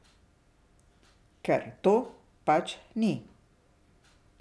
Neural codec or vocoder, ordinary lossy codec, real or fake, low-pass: none; none; real; none